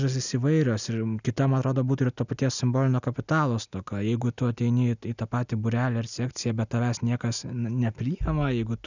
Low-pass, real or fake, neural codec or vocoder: 7.2 kHz; real; none